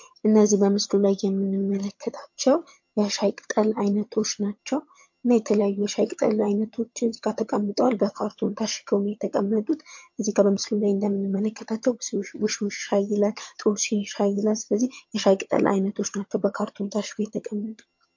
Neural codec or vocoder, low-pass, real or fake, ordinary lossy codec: vocoder, 22.05 kHz, 80 mel bands, HiFi-GAN; 7.2 kHz; fake; MP3, 48 kbps